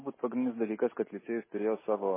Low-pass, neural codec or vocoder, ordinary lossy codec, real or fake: 3.6 kHz; none; MP3, 16 kbps; real